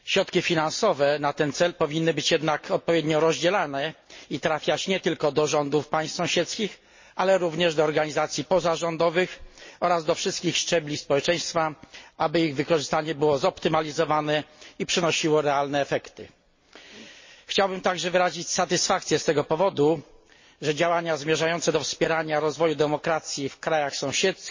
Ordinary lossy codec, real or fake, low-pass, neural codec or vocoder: MP3, 32 kbps; real; 7.2 kHz; none